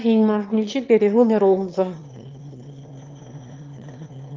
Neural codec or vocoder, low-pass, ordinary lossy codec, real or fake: autoencoder, 22.05 kHz, a latent of 192 numbers a frame, VITS, trained on one speaker; 7.2 kHz; Opus, 24 kbps; fake